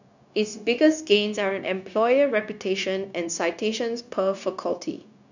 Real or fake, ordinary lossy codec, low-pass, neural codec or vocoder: fake; none; 7.2 kHz; codec, 16 kHz, 0.9 kbps, LongCat-Audio-Codec